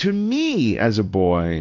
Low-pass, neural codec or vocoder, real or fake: 7.2 kHz; codec, 16 kHz in and 24 kHz out, 1 kbps, XY-Tokenizer; fake